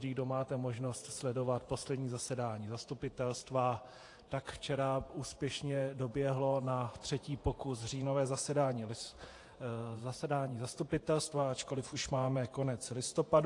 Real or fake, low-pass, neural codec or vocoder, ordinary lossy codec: fake; 10.8 kHz; vocoder, 48 kHz, 128 mel bands, Vocos; AAC, 48 kbps